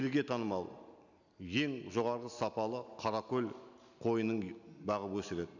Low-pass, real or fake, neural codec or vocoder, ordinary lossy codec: 7.2 kHz; real; none; none